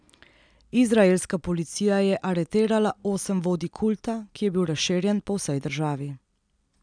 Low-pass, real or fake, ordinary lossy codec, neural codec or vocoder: 9.9 kHz; real; none; none